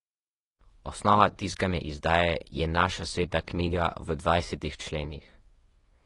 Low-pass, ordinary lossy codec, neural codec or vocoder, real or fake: 10.8 kHz; AAC, 32 kbps; codec, 24 kHz, 0.9 kbps, WavTokenizer, medium speech release version 1; fake